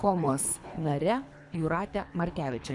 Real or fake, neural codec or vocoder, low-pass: fake; codec, 24 kHz, 3 kbps, HILCodec; 10.8 kHz